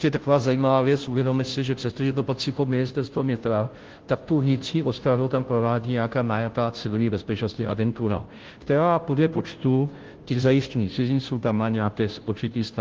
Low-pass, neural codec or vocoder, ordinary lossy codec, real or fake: 7.2 kHz; codec, 16 kHz, 0.5 kbps, FunCodec, trained on Chinese and English, 25 frames a second; Opus, 16 kbps; fake